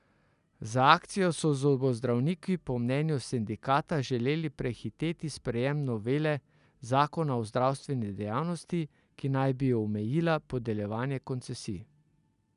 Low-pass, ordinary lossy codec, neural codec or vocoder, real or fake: 10.8 kHz; none; none; real